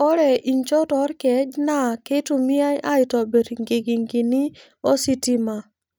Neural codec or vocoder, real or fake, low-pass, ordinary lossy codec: none; real; none; none